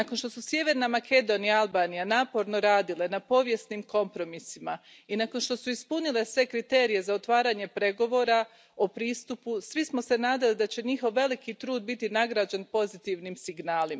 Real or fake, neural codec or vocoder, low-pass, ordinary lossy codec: real; none; none; none